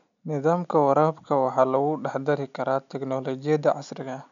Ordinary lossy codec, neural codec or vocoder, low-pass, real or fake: none; none; 7.2 kHz; real